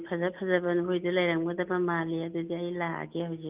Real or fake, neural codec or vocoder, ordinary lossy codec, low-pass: real; none; Opus, 24 kbps; 3.6 kHz